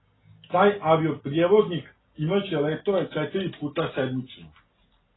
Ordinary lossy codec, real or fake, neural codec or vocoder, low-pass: AAC, 16 kbps; real; none; 7.2 kHz